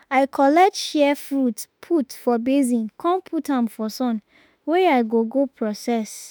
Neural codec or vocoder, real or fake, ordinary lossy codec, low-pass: autoencoder, 48 kHz, 32 numbers a frame, DAC-VAE, trained on Japanese speech; fake; none; none